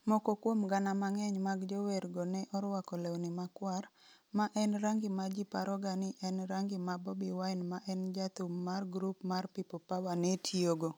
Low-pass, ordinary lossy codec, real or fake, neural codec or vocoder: none; none; real; none